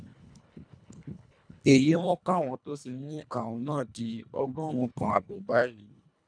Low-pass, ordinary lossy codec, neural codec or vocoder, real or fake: 9.9 kHz; none; codec, 24 kHz, 1.5 kbps, HILCodec; fake